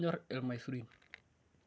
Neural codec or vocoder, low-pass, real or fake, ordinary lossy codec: none; none; real; none